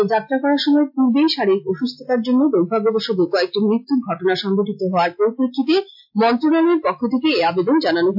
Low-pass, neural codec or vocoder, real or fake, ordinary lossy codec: 5.4 kHz; none; real; AAC, 48 kbps